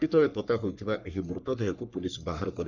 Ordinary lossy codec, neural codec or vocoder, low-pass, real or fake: none; codec, 44.1 kHz, 3.4 kbps, Pupu-Codec; 7.2 kHz; fake